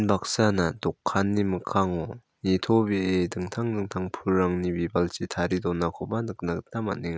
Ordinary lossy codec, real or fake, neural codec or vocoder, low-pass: none; real; none; none